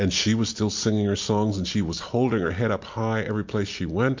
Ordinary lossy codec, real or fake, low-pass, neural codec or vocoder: MP3, 48 kbps; real; 7.2 kHz; none